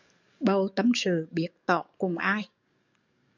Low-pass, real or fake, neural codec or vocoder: 7.2 kHz; fake; codec, 44.1 kHz, 7.8 kbps, DAC